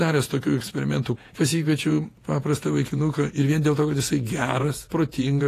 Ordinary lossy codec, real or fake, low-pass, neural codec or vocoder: AAC, 48 kbps; fake; 14.4 kHz; vocoder, 48 kHz, 128 mel bands, Vocos